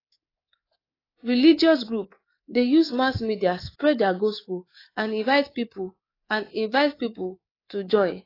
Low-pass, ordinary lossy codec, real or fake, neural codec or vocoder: 5.4 kHz; AAC, 24 kbps; fake; codec, 24 kHz, 3.1 kbps, DualCodec